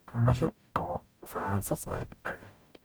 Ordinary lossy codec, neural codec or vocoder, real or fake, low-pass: none; codec, 44.1 kHz, 0.9 kbps, DAC; fake; none